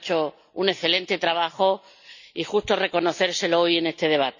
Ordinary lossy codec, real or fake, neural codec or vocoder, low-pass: none; real; none; 7.2 kHz